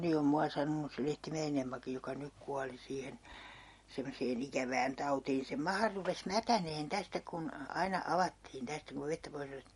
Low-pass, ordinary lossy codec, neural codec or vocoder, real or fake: 19.8 kHz; MP3, 48 kbps; none; real